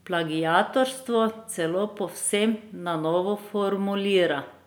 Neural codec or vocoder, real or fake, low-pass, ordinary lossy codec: none; real; none; none